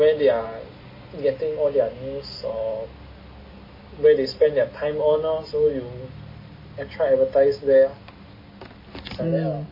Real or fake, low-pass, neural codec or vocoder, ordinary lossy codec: real; 5.4 kHz; none; MP3, 24 kbps